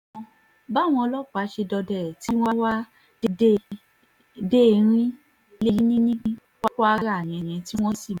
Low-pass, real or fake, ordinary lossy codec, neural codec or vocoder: 19.8 kHz; real; none; none